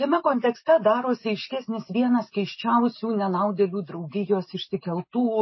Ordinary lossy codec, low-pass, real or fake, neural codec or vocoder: MP3, 24 kbps; 7.2 kHz; real; none